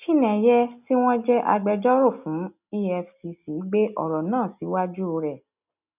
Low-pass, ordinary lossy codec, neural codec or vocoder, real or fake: 3.6 kHz; none; none; real